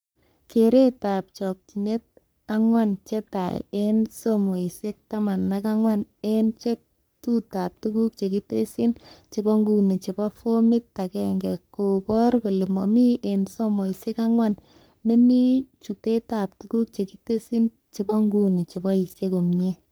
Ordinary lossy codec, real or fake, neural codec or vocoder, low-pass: none; fake; codec, 44.1 kHz, 3.4 kbps, Pupu-Codec; none